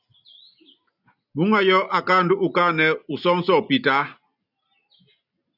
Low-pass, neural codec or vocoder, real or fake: 5.4 kHz; none; real